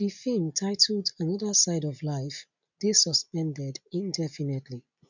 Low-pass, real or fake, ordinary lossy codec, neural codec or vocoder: 7.2 kHz; real; none; none